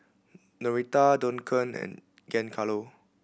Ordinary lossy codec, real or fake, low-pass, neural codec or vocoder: none; real; none; none